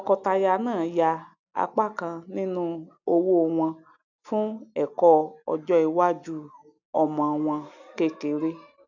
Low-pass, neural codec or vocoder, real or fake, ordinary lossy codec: 7.2 kHz; none; real; none